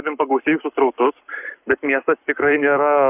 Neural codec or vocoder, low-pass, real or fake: codec, 44.1 kHz, 7.8 kbps, Pupu-Codec; 3.6 kHz; fake